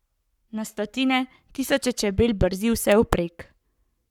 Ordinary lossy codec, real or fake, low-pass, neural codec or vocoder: none; fake; 19.8 kHz; codec, 44.1 kHz, 7.8 kbps, Pupu-Codec